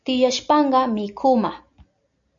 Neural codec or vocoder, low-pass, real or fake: none; 7.2 kHz; real